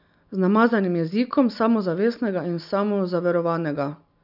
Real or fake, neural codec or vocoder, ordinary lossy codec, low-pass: real; none; none; 5.4 kHz